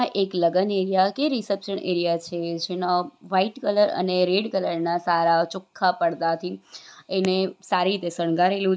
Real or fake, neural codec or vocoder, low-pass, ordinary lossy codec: real; none; none; none